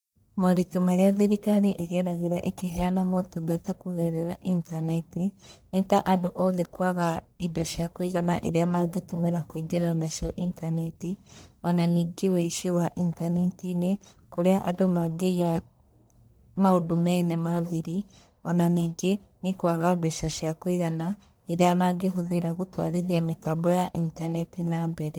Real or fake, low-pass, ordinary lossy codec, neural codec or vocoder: fake; none; none; codec, 44.1 kHz, 1.7 kbps, Pupu-Codec